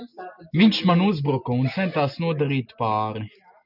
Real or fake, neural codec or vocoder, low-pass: real; none; 5.4 kHz